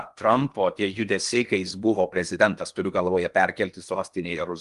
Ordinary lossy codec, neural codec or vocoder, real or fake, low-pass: Opus, 32 kbps; codec, 16 kHz in and 24 kHz out, 0.8 kbps, FocalCodec, streaming, 65536 codes; fake; 10.8 kHz